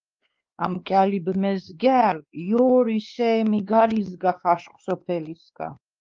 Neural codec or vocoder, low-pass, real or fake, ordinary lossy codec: codec, 16 kHz, 2 kbps, X-Codec, WavLM features, trained on Multilingual LibriSpeech; 7.2 kHz; fake; Opus, 32 kbps